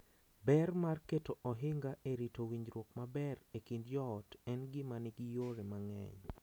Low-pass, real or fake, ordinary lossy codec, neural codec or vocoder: none; real; none; none